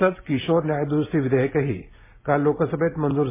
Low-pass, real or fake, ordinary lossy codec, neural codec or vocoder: 3.6 kHz; real; MP3, 16 kbps; none